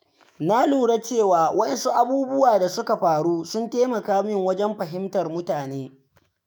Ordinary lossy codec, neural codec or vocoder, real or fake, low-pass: none; autoencoder, 48 kHz, 128 numbers a frame, DAC-VAE, trained on Japanese speech; fake; none